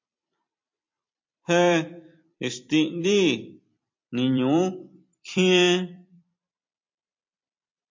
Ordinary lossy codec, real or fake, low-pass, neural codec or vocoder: MP3, 48 kbps; fake; 7.2 kHz; vocoder, 44.1 kHz, 128 mel bands every 256 samples, BigVGAN v2